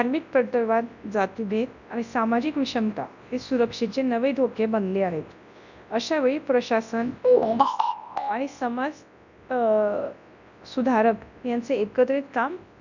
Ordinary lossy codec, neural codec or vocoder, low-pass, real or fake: none; codec, 24 kHz, 0.9 kbps, WavTokenizer, large speech release; 7.2 kHz; fake